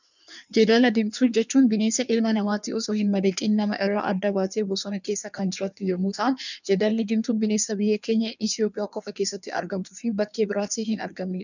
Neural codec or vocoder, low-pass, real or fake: codec, 16 kHz in and 24 kHz out, 1.1 kbps, FireRedTTS-2 codec; 7.2 kHz; fake